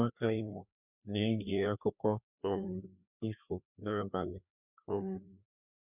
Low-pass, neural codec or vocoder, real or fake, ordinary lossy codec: 3.6 kHz; codec, 16 kHz, 2 kbps, FreqCodec, larger model; fake; none